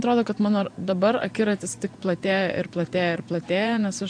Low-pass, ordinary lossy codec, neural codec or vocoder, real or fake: 9.9 kHz; AAC, 64 kbps; vocoder, 24 kHz, 100 mel bands, Vocos; fake